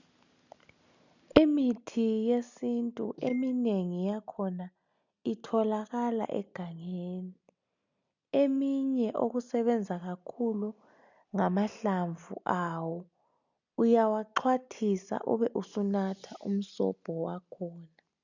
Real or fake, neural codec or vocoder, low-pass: real; none; 7.2 kHz